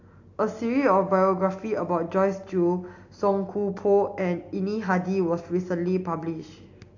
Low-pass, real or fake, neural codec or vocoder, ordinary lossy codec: 7.2 kHz; real; none; AAC, 48 kbps